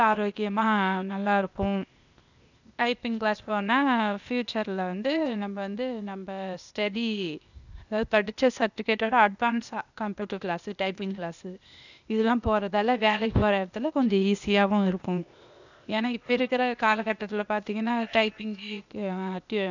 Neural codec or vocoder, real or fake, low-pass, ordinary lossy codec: codec, 16 kHz, 0.8 kbps, ZipCodec; fake; 7.2 kHz; none